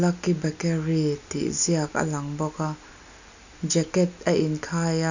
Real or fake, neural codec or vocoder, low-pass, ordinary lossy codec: real; none; 7.2 kHz; none